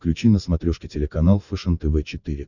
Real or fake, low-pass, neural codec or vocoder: fake; 7.2 kHz; vocoder, 44.1 kHz, 128 mel bands every 512 samples, BigVGAN v2